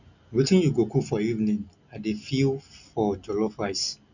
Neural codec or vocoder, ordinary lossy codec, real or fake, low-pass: none; none; real; 7.2 kHz